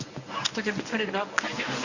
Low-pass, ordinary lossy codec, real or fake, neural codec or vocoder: 7.2 kHz; none; fake; codec, 24 kHz, 0.9 kbps, WavTokenizer, medium speech release version 1